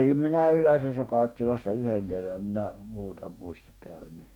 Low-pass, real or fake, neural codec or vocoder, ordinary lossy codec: 19.8 kHz; fake; codec, 44.1 kHz, 2.6 kbps, DAC; none